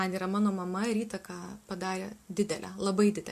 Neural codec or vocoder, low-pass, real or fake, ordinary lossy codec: none; 14.4 kHz; real; MP3, 64 kbps